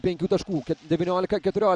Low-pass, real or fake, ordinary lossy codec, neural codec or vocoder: 9.9 kHz; real; Opus, 64 kbps; none